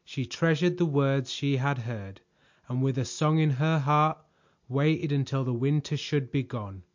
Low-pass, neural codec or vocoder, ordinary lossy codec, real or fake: 7.2 kHz; none; MP3, 48 kbps; real